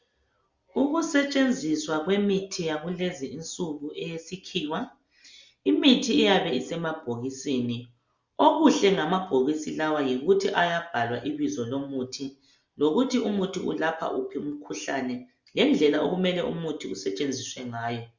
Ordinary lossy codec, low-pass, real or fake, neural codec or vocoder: Opus, 64 kbps; 7.2 kHz; real; none